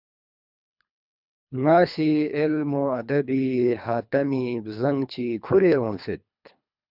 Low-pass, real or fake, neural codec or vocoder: 5.4 kHz; fake; codec, 24 kHz, 3 kbps, HILCodec